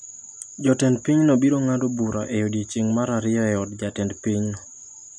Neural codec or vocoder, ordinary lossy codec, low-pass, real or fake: none; none; none; real